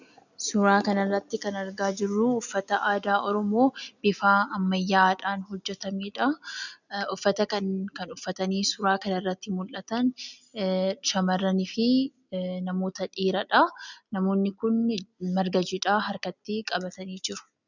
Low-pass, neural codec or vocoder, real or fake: 7.2 kHz; none; real